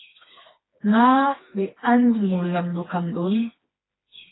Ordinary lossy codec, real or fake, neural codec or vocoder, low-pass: AAC, 16 kbps; fake; codec, 16 kHz, 2 kbps, FreqCodec, smaller model; 7.2 kHz